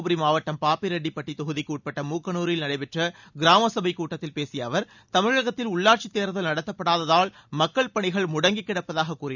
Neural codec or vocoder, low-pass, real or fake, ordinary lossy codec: none; 7.2 kHz; real; none